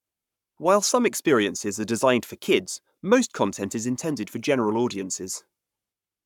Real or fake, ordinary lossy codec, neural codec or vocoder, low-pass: fake; none; codec, 44.1 kHz, 7.8 kbps, Pupu-Codec; 19.8 kHz